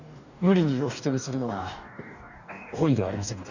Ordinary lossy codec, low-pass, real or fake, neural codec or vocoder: none; 7.2 kHz; fake; codec, 44.1 kHz, 2.6 kbps, DAC